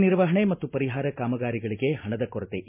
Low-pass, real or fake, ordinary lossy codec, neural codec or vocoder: 3.6 kHz; real; MP3, 32 kbps; none